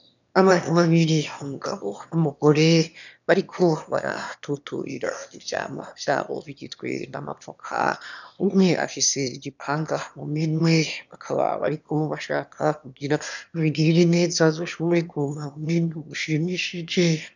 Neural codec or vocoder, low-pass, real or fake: autoencoder, 22.05 kHz, a latent of 192 numbers a frame, VITS, trained on one speaker; 7.2 kHz; fake